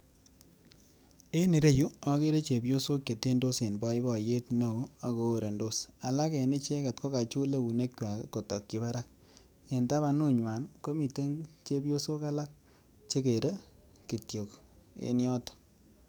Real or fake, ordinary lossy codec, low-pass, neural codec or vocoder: fake; none; none; codec, 44.1 kHz, 7.8 kbps, DAC